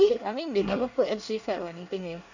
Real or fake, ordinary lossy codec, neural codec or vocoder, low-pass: fake; none; codec, 24 kHz, 1 kbps, SNAC; 7.2 kHz